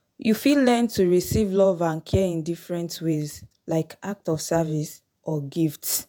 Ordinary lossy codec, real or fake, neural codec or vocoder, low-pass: none; fake; vocoder, 48 kHz, 128 mel bands, Vocos; none